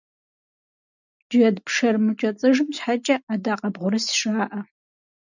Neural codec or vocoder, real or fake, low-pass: none; real; 7.2 kHz